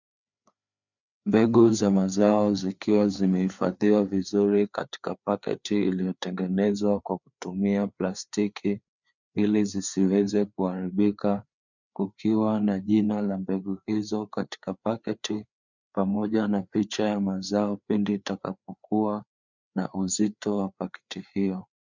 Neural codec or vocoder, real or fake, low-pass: codec, 16 kHz, 4 kbps, FreqCodec, larger model; fake; 7.2 kHz